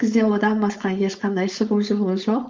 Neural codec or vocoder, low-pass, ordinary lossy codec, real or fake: codec, 16 kHz, 4.8 kbps, FACodec; 7.2 kHz; Opus, 32 kbps; fake